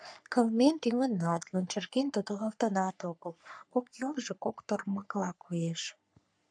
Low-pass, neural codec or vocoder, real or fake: 9.9 kHz; codec, 44.1 kHz, 3.4 kbps, Pupu-Codec; fake